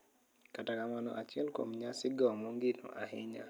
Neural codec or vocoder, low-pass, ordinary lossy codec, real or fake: none; none; none; real